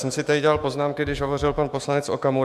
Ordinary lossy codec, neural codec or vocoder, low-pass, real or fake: AAC, 96 kbps; autoencoder, 48 kHz, 128 numbers a frame, DAC-VAE, trained on Japanese speech; 14.4 kHz; fake